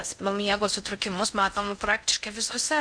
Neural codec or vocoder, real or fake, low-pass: codec, 16 kHz in and 24 kHz out, 0.6 kbps, FocalCodec, streaming, 4096 codes; fake; 9.9 kHz